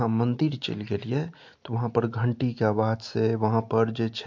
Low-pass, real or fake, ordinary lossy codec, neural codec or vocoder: 7.2 kHz; real; MP3, 48 kbps; none